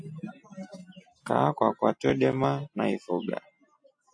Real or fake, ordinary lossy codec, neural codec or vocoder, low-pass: real; AAC, 64 kbps; none; 9.9 kHz